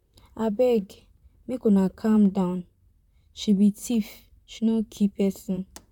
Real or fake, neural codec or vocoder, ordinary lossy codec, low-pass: fake; vocoder, 44.1 kHz, 128 mel bands, Pupu-Vocoder; none; 19.8 kHz